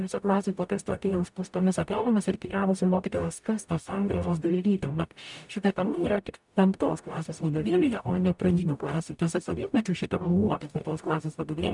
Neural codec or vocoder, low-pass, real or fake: codec, 44.1 kHz, 0.9 kbps, DAC; 10.8 kHz; fake